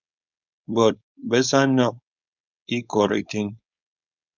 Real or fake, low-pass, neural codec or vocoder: fake; 7.2 kHz; codec, 16 kHz, 4.8 kbps, FACodec